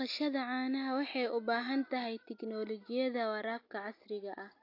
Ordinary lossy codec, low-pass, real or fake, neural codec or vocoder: AAC, 32 kbps; 5.4 kHz; real; none